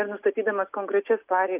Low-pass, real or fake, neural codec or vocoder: 3.6 kHz; real; none